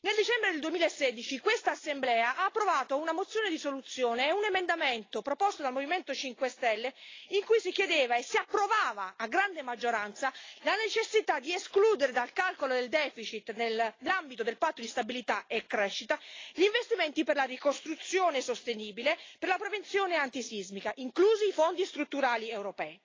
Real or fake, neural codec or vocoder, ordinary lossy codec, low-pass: real; none; AAC, 32 kbps; 7.2 kHz